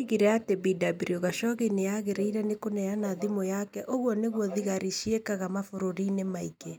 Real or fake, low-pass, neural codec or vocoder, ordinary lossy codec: real; none; none; none